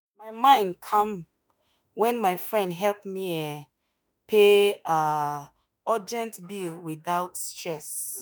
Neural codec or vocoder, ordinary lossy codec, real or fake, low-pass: autoencoder, 48 kHz, 32 numbers a frame, DAC-VAE, trained on Japanese speech; none; fake; none